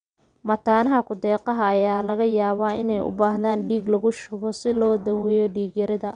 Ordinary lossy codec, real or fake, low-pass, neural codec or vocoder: none; fake; 9.9 kHz; vocoder, 22.05 kHz, 80 mel bands, WaveNeXt